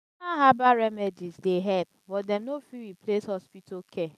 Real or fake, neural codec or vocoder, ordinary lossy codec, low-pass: real; none; none; 14.4 kHz